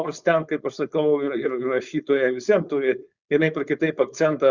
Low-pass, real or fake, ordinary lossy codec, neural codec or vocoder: 7.2 kHz; fake; Opus, 64 kbps; codec, 16 kHz, 4.8 kbps, FACodec